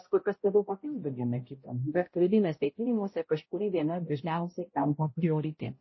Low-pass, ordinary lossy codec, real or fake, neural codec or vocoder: 7.2 kHz; MP3, 24 kbps; fake; codec, 16 kHz, 0.5 kbps, X-Codec, HuBERT features, trained on balanced general audio